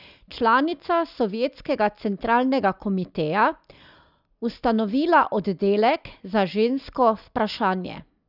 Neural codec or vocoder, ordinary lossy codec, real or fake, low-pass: none; none; real; 5.4 kHz